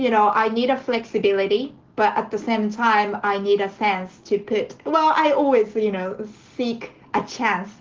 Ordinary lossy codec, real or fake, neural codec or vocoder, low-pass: Opus, 16 kbps; real; none; 7.2 kHz